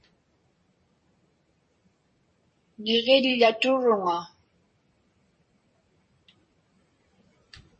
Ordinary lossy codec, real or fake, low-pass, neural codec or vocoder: MP3, 32 kbps; fake; 10.8 kHz; vocoder, 44.1 kHz, 128 mel bands every 256 samples, BigVGAN v2